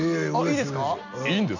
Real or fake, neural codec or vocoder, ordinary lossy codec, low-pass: real; none; none; 7.2 kHz